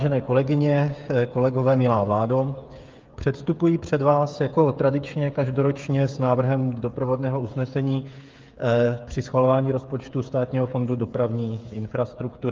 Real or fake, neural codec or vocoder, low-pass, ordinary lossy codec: fake; codec, 16 kHz, 8 kbps, FreqCodec, smaller model; 7.2 kHz; Opus, 24 kbps